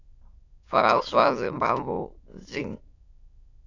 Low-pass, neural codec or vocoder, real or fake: 7.2 kHz; autoencoder, 22.05 kHz, a latent of 192 numbers a frame, VITS, trained on many speakers; fake